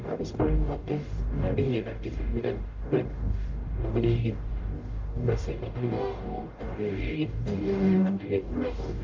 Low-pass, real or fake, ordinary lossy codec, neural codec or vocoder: 7.2 kHz; fake; Opus, 32 kbps; codec, 44.1 kHz, 0.9 kbps, DAC